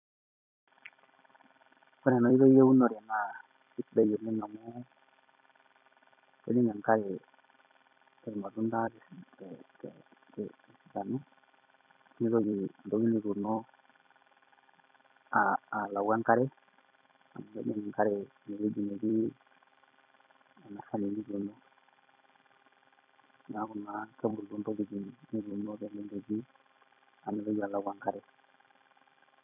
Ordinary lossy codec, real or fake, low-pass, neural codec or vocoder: none; fake; 3.6 kHz; vocoder, 44.1 kHz, 128 mel bands every 256 samples, BigVGAN v2